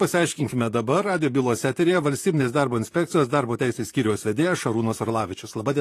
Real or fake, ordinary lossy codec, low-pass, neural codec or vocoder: fake; AAC, 48 kbps; 14.4 kHz; vocoder, 44.1 kHz, 128 mel bands, Pupu-Vocoder